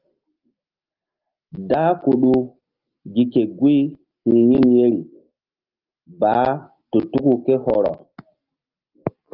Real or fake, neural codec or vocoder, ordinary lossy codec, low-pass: real; none; Opus, 32 kbps; 5.4 kHz